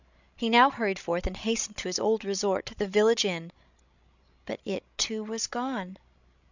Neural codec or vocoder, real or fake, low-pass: codec, 16 kHz, 16 kbps, FreqCodec, larger model; fake; 7.2 kHz